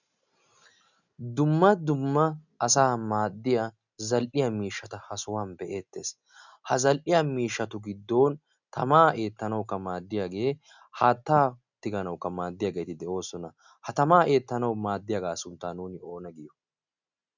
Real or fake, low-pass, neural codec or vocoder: real; 7.2 kHz; none